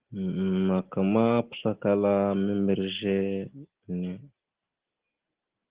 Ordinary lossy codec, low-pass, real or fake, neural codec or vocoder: Opus, 16 kbps; 3.6 kHz; real; none